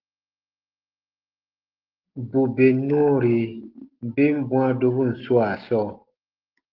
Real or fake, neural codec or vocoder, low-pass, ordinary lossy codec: real; none; 5.4 kHz; Opus, 16 kbps